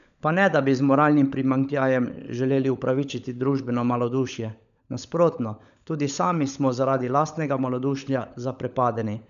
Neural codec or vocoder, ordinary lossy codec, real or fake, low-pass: codec, 16 kHz, 16 kbps, FunCodec, trained on LibriTTS, 50 frames a second; none; fake; 7.2 kHz